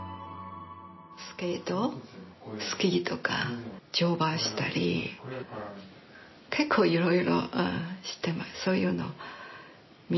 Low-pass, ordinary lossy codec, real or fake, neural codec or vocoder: 7.2 kHz; MP3, 24 kbps; real; none